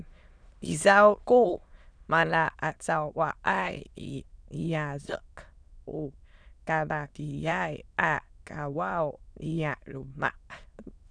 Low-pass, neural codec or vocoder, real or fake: 9.9 kHz; autoencoder, 22.05 kHz, a latent of 192 numbers a frame, VITS, trained on many speakers; fake